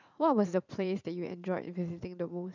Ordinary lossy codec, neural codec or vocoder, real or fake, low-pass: none; vocoder, 44.1 kHz, 80 mel bands, Vocos; fake; 7.2 kHz